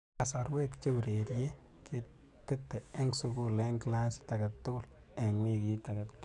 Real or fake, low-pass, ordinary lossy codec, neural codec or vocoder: fake; 10.8 kHz; none; codec, 44.1 kHz, 7.8 kbps, DAC